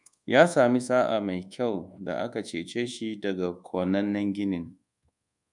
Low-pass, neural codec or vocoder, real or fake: 10.8 kHz; codec, 24 kHz, 1.2 kbps, DualCodec; fake